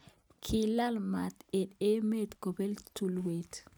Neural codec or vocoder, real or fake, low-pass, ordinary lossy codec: none; real; none; none